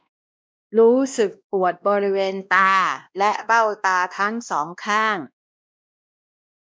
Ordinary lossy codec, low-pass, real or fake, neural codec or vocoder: none; none; fake; codec, 16 kHz, 1 kbps, X-Codec, WavLM features, trained on Multilingual LibriSpeech